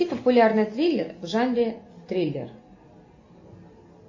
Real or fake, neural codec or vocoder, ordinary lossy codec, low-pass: real; none; MP3, 32 kbps; 7.2 kHz